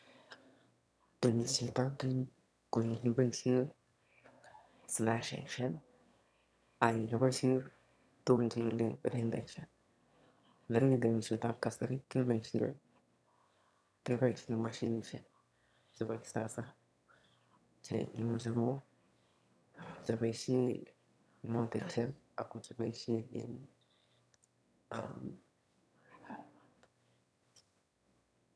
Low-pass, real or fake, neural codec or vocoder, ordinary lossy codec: none; fake; autoencoder, 22.05 kHz, a latent of 192 numbers a frame, VITS, trained on one speaker; none